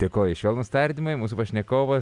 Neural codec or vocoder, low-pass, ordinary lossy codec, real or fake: none; 10.8 kHz; Opus, 64 kbps; real